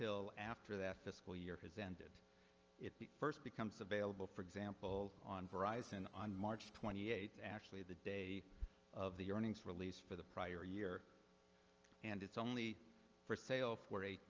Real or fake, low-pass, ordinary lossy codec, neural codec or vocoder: real; 7.2 kHz; Opus, 24 kbps; none